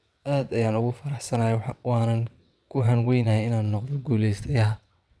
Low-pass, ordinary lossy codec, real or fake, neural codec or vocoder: none; none; fake; vocoder, 22.05 kHz, 80 mel bands, WaveNeXt